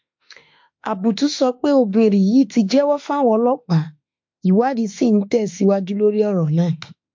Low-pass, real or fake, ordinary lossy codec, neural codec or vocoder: 7.2 kHz; fake; MP3, 48 kbps; autoencoder, 48 kHz, 32 numbers a frame, DAC-VAE, trained on Japanese speech